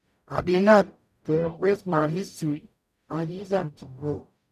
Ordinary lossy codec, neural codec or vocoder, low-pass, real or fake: none; codec, 44.1 kHz, 0.9 kbps, DAC; 14.4 kHz; fake